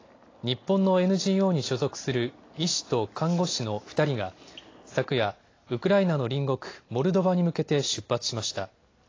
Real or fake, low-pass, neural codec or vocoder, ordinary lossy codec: real; 7.2 kHz; none; AAC, 32 kbps